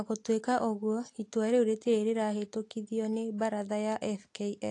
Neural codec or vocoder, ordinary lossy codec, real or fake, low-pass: none; AAC, 48 kbps; real; 10.8 kHz